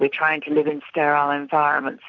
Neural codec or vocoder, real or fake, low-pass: none; real; 7.2 kHz